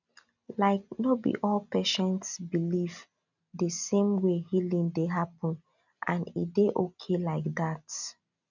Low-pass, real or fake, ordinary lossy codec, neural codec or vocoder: 7.2 kHz; real; none; none